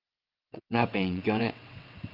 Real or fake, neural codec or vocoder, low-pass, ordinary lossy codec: fake; vocoder, 22.05 kHz, 80 mel bands, WaveNeXt; 5.4 kHz; Opus, 32 kbps